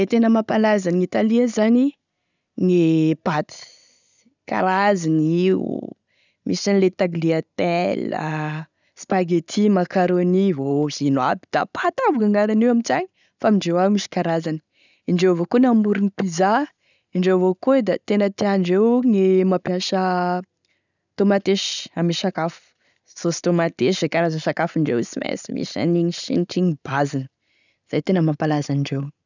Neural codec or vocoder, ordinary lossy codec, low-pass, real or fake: none; none; 7.2 kHz; real